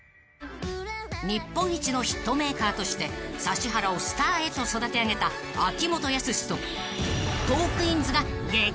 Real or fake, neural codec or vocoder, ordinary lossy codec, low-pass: real; none; none; none